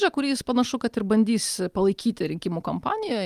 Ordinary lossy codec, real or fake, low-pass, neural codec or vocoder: Opus, 24 kbps; real; 14.4 kHz; none